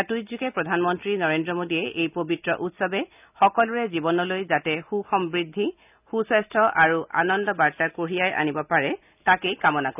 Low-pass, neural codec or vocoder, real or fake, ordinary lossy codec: 3.6 kHz; none; real; none